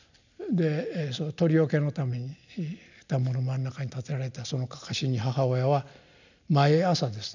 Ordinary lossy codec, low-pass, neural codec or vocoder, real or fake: none; 7.2 kHz; none; real